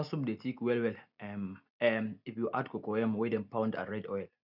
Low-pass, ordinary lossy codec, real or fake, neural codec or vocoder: 5.4 kHz; none; real; none